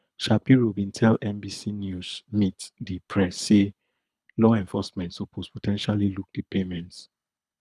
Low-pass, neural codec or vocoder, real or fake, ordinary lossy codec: none; codec, 24 kHz, 6 kbps, HILCodec; fake; none